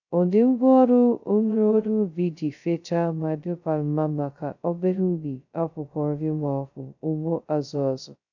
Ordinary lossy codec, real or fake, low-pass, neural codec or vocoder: none; fake; 7.2 kHz; codec, 16 kHz, 0.2 kbps, FocalCodec